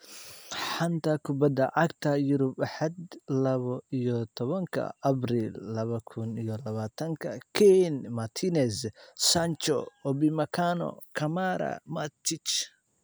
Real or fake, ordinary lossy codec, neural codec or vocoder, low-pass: real; none; none; none